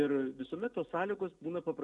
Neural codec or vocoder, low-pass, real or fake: none; 10.8 kHz; real